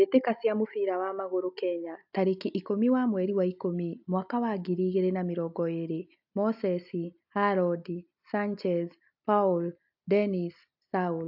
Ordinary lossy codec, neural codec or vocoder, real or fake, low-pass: AAC, 48 kbps; none; real; 5.4 kHz